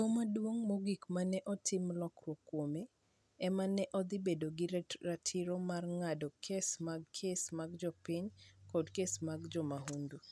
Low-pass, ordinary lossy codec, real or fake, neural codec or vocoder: none; none; real; none